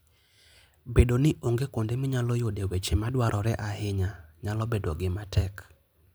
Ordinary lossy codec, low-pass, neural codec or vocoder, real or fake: none; none; none; real